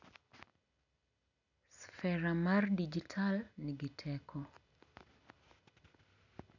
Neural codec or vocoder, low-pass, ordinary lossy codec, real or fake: none; 7.2 kHz; none; real